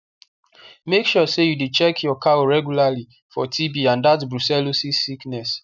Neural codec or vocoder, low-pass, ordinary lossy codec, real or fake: none; 7.2 kHz; none; real